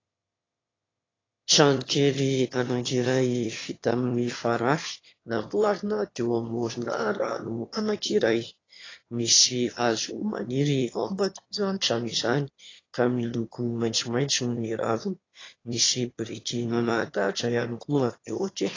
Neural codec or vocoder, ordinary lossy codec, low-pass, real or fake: autoencoder, 22.05 kHz, a latent of 192 numbers a frame, VITS, trained on one speaker; AAC, 32 kbps; 7.2 kHz; fake